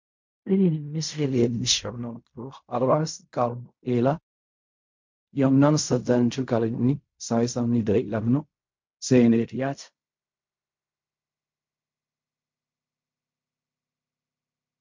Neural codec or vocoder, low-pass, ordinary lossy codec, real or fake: codec, 16 kHz in and 24 kHz out, 0.4 kbps, LongCat-Audio-Codec, fine tuned four codebook decoder; 7.2 kHz; MP3, 48 kbps; fake